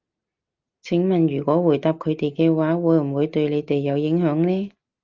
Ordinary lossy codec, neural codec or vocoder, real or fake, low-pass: Opus, 32 kbps; none; real; 7.2 kHz